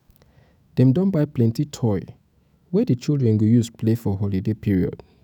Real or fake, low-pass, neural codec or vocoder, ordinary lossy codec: fake; 19.8 kHz; autoencoder, 48 kHz, 128 numbers a frame, DAC-VAE, trained on Japanese speech; none